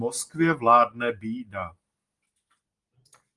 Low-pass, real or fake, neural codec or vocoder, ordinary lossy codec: 10.8 kHz; real; none; Opus, 24 kbps